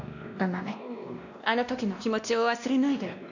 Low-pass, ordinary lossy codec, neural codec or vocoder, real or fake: 7.2 kHz; none; codec, 16 kHz, 1 kbps, X-Codec, WavLM features, trained on Multilingual LibriSpeech; fake